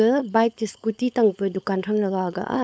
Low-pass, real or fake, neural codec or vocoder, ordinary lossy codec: none; fake; codec, 16 kHz, 4.8 kbps, FACodec; none